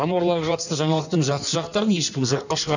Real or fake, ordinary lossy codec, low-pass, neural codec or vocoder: fake; none; 7.2 kHz; codec, 16 kHz in and 24 kHz out, 1.1 kbps, FireRedTTS-2 codec